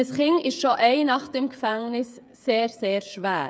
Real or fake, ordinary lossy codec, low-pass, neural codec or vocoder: fake; none; none; codec, 16 kHz, 8 kbps, FreqCodec, smaller model